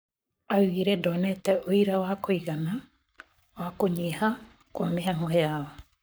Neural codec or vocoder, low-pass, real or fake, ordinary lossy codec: codec, 44.1 kHz, 7.8 kbps, Pupu-Codec; none; fake; none